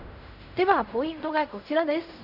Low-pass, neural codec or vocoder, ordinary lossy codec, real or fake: 5.4 kHz; codec, 16 kHz in and 24 kHz out, 0.4 kbps, LongCat-Audio-Codec, fine tuned four codebook decoder; none; fake